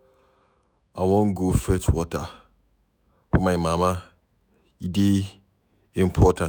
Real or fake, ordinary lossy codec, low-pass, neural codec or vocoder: fake; none; none; autoencoder, 48 kHz, 128 numbers a frame, DAC-VAE, trained on Japanese speech